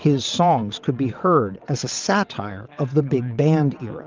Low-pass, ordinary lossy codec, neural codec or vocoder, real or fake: 7.2 kHz; Opus, 24 kbps; vocoder, 22.05 kHz, 80 mel bands, WaveNeXt; fake